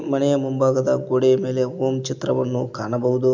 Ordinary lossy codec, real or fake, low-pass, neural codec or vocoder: MP3, 64 kbps; real; 7.2 kHz; none